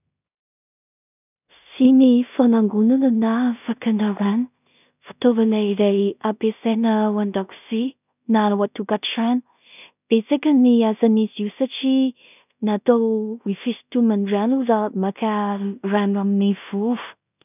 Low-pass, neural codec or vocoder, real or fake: 3.6 kHz; codec, 16 kHz in and 24 kHz out, 0.4 kbps, LongCat-Audio-Codec, two codebook decoder; fake